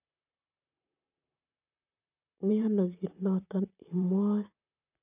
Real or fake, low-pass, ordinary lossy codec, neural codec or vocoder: fake; 3.6 kHz; none; vocoder, 44.1 kHz, 128 mel bands, Pupu-Vocoder